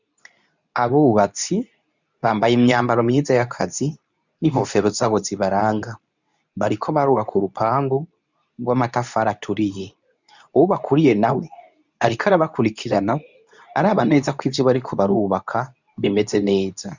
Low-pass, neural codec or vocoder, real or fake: 7.2 kHz; codec, 24 kHz, 0.9 kbps, WavTokenizer, medium speech release version 2; fake